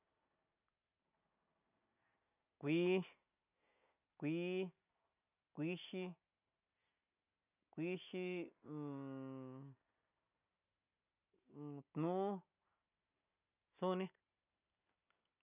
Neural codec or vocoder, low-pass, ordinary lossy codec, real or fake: none; 3.6 kHz; none; real